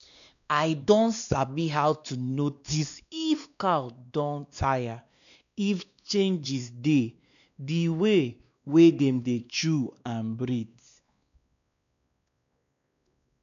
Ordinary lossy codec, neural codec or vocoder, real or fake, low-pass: none; codec, 16 kHz, 2 kbps, X-Codec, WavLM features, trained on Multilingual LibriSpeech; fake; 7.2 kHz